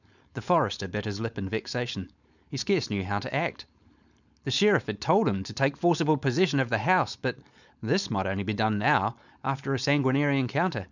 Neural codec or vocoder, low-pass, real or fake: codec, 16 kHz, 4.8 kbps, FACodec; 7.2 kHz; fake